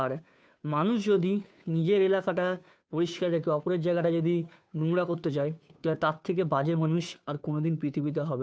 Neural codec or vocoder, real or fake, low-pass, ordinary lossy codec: codec, 16 kHz, 2 kbps, FunCodec, trained on Chinese and English, 25 frames a second; fake; none; none